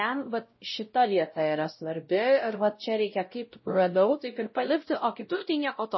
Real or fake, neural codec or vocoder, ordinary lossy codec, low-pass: fake; codec, 16 kHz, 0.5 kbps, X-Codec, WavLM features, trained on Multilingual LibriSpeech; MP3, 24 kbps; 7.2 kHz